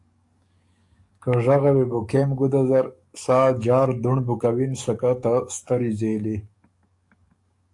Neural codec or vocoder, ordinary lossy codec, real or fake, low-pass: codec, 44.1 kHz, 7.8 kbps, DAC; AAC, 64 kbps; fake; 10.8 kHz